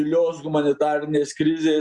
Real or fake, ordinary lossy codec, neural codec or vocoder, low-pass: real; Opus, 64 kbps; none; 10.8 kHz